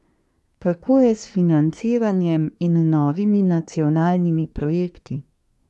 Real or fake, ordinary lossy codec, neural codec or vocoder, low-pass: fake; none; codec, 24 kHz, 1 kbps, SNAC; none